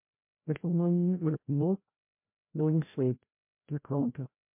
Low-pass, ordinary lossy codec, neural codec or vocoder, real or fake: 3.6 kHz; MP3, 32 kbps; codec, 16 kHz, 0.5 kbps, FreqCodec, larger model; fake